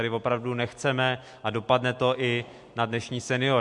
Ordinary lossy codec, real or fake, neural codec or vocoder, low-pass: MP3, 64 kbps; real; none; 10.8 kHz